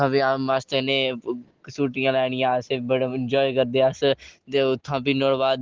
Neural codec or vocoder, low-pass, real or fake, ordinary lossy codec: none; 7.2 kHz; real; Opus, 16 kbps